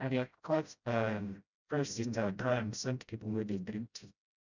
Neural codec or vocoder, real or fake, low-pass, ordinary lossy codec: codec, 16 kHz, 0.5 kbps, FreqCodec, smaller model; fake; 7.2 kHz; none